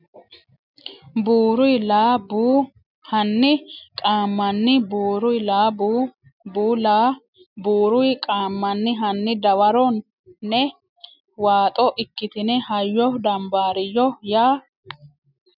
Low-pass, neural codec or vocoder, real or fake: 5.4 kHz; none; real